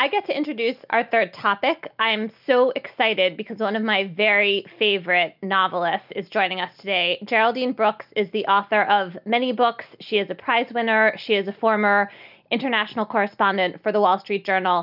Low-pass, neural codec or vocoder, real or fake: 5.4 kHz; none; real